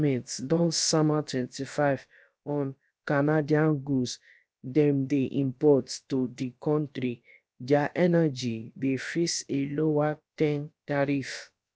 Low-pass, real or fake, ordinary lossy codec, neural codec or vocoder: none; fake; none; codec, 16 kHz, about 1 kbps, DyCAST, with the encoder's durations